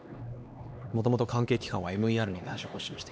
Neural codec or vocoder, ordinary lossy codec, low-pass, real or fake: codec, 16 kHz, 2 kbps, X-Codec, HuBERT features, trained on LibriSpeech; none; none; fake